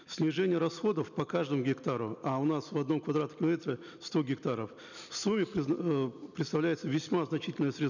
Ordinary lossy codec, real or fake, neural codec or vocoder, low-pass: none; real; none; 7.2 kHz